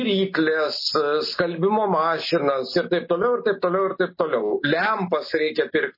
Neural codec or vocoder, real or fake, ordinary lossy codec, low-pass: none; real; MP3, 24 kbps; 5.4 kHz